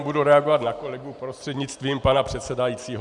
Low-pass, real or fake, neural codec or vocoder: 10.8 kHz; real; none